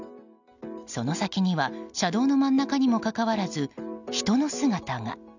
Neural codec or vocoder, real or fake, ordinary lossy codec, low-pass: none; real; none; 7.2 kHz